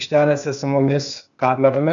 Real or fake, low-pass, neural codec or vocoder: fake; 7.2 kHz; codec, 16 kHz, 0.8 kbps, ZipCodec